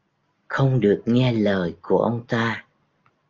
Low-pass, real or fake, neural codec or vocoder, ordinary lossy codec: 7.2 kHz; real; none; Opus, 32 kbps